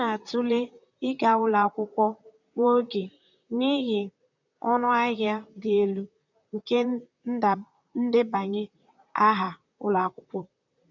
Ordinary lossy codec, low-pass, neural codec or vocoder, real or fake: none; 7.2 kHz; vocoder, 44.1 kHz, 128 mel bands, Pupu-Vocoder; fake